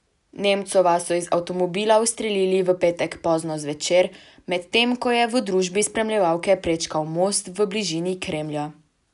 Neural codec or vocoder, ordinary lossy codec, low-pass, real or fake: none; none; 10.8 kHz; real